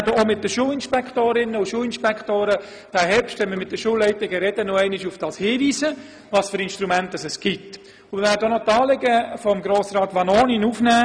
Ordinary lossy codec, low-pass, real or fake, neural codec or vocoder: none; 9.9 kHz; real; none